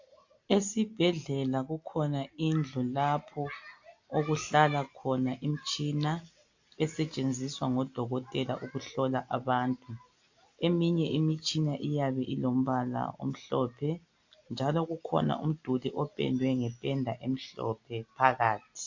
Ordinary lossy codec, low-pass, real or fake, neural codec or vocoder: AAC, 48 kbps; 7.2 kHz; real; none